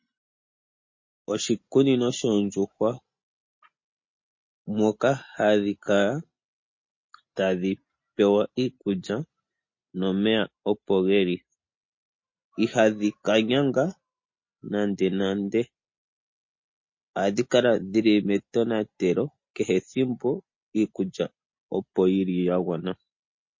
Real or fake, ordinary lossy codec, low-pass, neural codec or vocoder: real; MP3, 32 kbps; 7.2 kHz; none